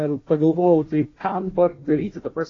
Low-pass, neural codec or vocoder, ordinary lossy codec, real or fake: 7.2 kHz; codec, 16 kHz, 1 kbps, FunCodec, trained on Chinese and English, 50 frames a second; AAC, 32 kbps; fake